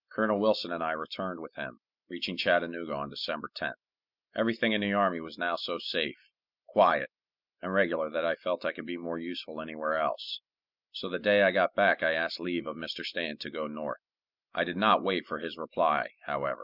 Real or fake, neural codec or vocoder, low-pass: real; none; 5.4 kHz